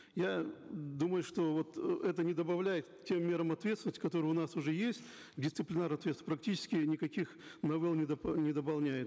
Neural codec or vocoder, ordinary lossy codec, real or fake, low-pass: none; none; real; none